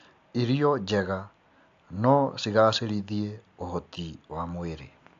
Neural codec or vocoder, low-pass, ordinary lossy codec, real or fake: none; 7.2 kHz; AAC, 64 kbps; real